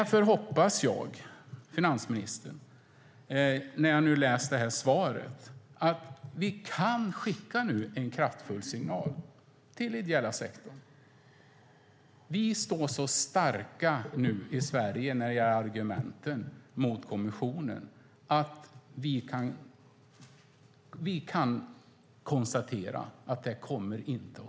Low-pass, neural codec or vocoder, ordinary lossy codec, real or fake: none; none; none; real